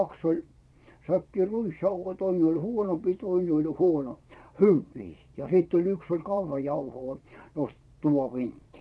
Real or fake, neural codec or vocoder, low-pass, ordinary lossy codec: fake; vocoder, 22.05 kHz, 80 mel bands, Vocos; none; none